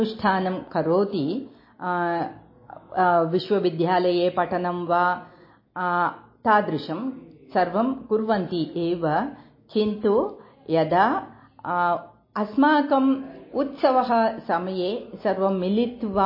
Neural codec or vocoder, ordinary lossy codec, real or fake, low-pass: none; MP3, 24 kbps; real; 5.4 kHz